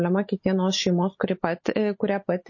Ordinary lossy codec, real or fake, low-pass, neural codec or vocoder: MP3, 32 kbps; real; 7.2 kHz; none